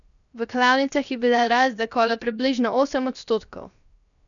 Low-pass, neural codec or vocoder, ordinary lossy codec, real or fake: 7.2 kHz; codec, 16 kHz, 0.7 kbps, FocalCodec; none; fake